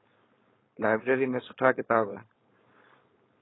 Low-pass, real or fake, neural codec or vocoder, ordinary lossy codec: 7.2 kHz; fake; codec, 16 kHz, 4.8 kbps, FACodec; AAC, 16 kbps